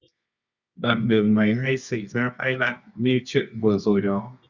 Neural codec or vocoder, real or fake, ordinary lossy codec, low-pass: codec, 24 kHz, 0.9 kbps, WavTokenizer, medium music audio release; fake; Opus, 64 kbps; 7.2 kHz